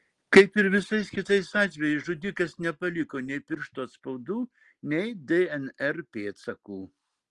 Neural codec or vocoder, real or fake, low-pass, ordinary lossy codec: none; real; 10.8 kHz; Opus, 24 kbps